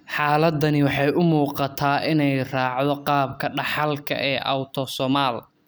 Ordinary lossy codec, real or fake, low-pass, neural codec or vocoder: none; real; none; none